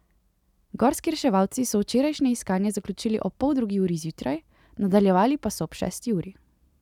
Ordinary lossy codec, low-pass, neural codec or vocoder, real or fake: none; 19.8 kHz; none; real